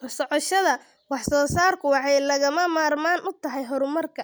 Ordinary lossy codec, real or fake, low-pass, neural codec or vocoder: none; real; none; none